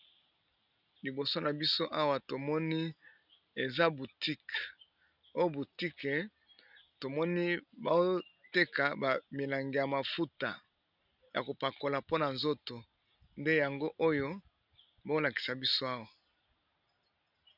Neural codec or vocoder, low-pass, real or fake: none; 5.4 kHz; real